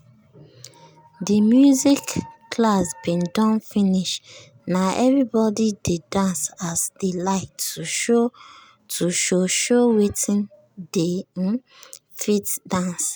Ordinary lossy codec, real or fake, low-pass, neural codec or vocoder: none; real; none; none